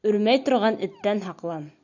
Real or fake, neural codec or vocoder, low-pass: real; none; 7.2 kHz